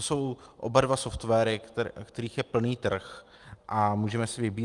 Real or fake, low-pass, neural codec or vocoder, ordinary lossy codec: real; 10.8 kHz; none; Opus, 32 kbps